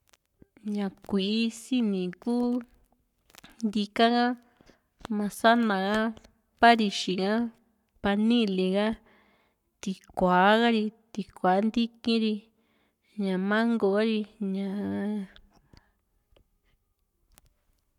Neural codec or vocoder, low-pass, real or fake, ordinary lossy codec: codec, 44.1 kHz, 7.8 kbps, Pupu-Codec; 19.8 kHz; fake; none